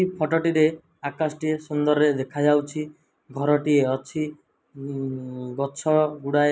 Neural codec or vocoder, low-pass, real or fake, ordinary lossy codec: none; none; real; none